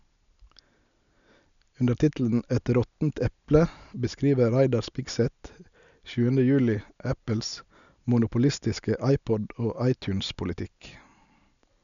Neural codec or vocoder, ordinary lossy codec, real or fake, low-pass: none; none; real; 7.2 kHz